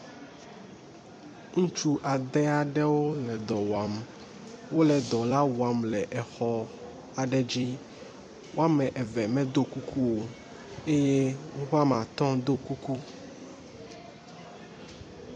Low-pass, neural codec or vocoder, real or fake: 9.9 kHz; vocoder, 44.1 kHz, 128 mel bands every 256 samples, BigVGAN v2; fake